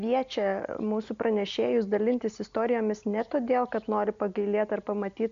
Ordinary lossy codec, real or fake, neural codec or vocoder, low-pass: MP3, 64 kbps; real; none; 7.2 kHz